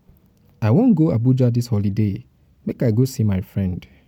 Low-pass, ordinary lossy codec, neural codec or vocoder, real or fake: 19.8 kHz; MP3, 96 kbps; none; real